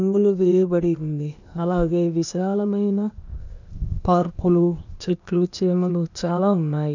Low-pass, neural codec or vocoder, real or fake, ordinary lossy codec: 7.2 kHz; codec, 16 kHz, 0.8 kbps, ZipCodec; fake; none